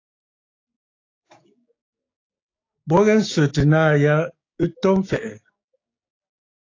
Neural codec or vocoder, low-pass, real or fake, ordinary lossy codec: codec, 16 kHz, 6 kbps, DAC; 7.2 kHz; fake; AAC, 32 kbps